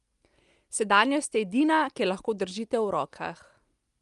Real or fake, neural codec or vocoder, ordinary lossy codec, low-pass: real; none; Opus, 32 kbps; 10.8 kHz